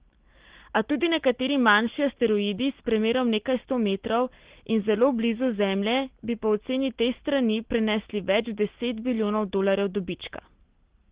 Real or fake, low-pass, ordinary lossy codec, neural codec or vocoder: real; 3.6 kHz; Opus, 16 kbps; none